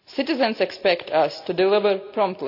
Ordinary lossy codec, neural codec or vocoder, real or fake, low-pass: none; none; real; 5.4 kHz